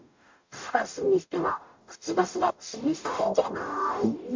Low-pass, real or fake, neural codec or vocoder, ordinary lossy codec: 7.2 kHz; fake; codec, 44.1 kHz, 0.9 kbps, DAC; MP3, 48 kbps